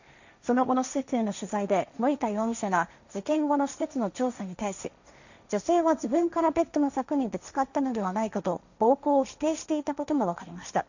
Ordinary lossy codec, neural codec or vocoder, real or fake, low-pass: none; codec, 16 kHz, 1.1 kbps, Voila-Tokenizer; fake; 7.2 kHz